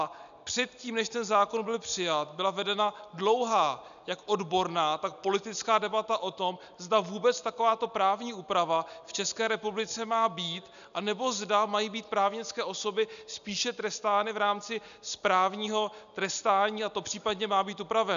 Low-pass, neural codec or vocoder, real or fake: 7.2 kHz; none; real